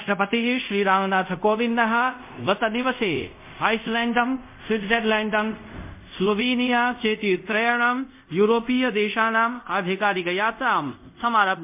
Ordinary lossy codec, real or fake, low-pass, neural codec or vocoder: MP3, 32 kbps; fake; 3.6 kHz; codec, 24 kHz, 0.5 kbps, DualCodec